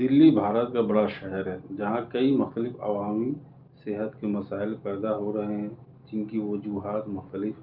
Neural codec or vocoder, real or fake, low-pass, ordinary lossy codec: none; real; 5.4 kHz; Opus, 24 kbps